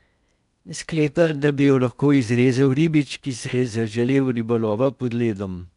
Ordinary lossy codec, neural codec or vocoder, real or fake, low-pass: none; codec, 16 kHz in and 24 kHz out, 0.8 kbps, FocalCodec, streaming, 65536 codes; fake; 10.8 kHz